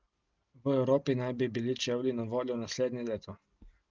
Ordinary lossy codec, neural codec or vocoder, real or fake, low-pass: Opus, 32 kbps; none; real; 7.2 kHz